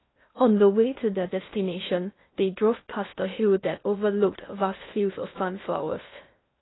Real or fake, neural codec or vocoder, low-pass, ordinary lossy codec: fake; codec, 16 kHz in and 24 kHz out, 0.6 kbps, FocalCodec, streaming, 2048 codes; 7.2 kHz; AAC, 16 kbps